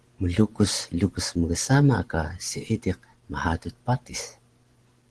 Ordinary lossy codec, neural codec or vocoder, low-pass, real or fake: Opus, 16 kbps; vocoder, 24 kHz, 100 mel bands, Vocos; 10.8 kHz; fake